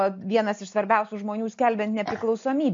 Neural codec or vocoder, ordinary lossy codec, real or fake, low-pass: none; MP3, 48 kbps; real; 7.2 kHz